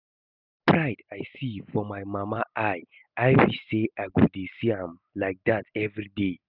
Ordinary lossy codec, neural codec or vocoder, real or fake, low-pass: none; none; real; 5.4 kHz